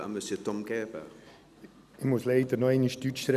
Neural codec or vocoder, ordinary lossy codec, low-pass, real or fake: none; none; 14.4 kHz; real